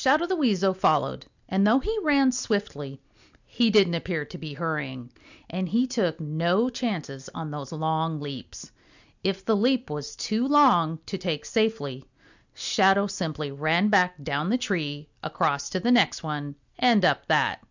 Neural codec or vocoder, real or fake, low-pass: none; real; 7.2 kHz